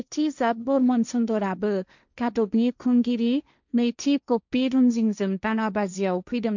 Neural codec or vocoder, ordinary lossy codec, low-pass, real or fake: codec, 16 kHz, 1.1 kbps, Voila-Tokenizer; none; 7.2 kHz; fake